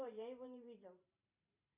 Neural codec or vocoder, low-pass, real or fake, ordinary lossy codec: vocoder, 44.1 kHz, 128 mel bands every 512 samples, BigVGAN v2; 3.6 kHz; fake; MP3, 32 kbps